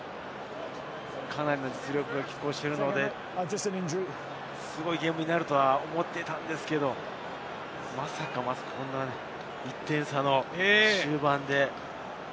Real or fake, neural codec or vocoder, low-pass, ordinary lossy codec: real; none; none; none